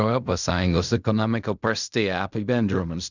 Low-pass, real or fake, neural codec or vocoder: 7.2 kHz; fake; codec, 16 kHz in and 24 kHz out, 0.4 kbps, LongCat-Audio-Codec, fine tuned four codebook decoder